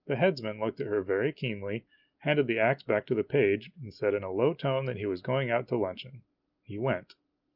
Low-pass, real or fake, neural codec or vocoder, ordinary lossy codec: 5.4 kHz; real; none; Opus, 24 kbps